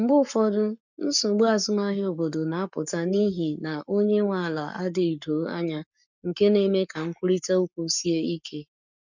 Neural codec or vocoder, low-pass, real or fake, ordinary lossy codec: codec, 44.1 kHz, 7.8 kbps, Pupu-Codec; 7.2 kHz; fake; none